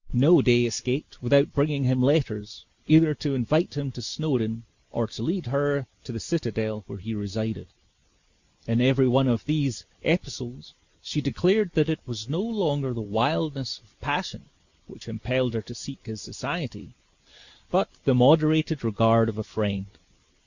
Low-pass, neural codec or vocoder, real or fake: 7.2 kHz; none; real